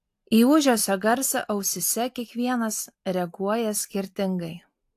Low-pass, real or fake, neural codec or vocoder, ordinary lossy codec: 14.4 kHz; real; none; AAC, 64 kbps